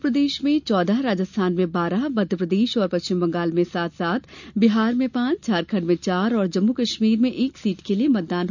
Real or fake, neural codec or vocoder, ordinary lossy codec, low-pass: real; none; none; 7.2 kHz